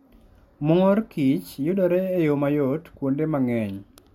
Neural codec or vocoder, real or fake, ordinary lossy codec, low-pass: none; real; MP3, 64 kbps; 19.8 kHz